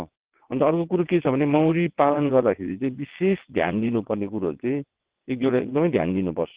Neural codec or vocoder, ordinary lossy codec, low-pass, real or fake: vocoder, 22.05 kHz, 80 mel bands, WaveNeXt; Opus, 16 kbps; 3.6 kHz; fake